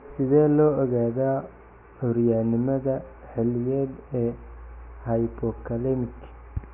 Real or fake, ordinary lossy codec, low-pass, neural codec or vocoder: real; none; 3.6 kHz; none